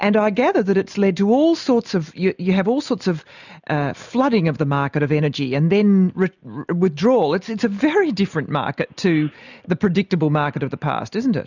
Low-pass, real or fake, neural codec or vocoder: 7.2 kHz; real; none